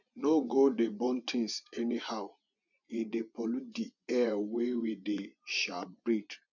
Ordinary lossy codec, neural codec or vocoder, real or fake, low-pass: none; vocoder, 24 kHz, 100 mel bands, Vocos; fake; 7.2 kHz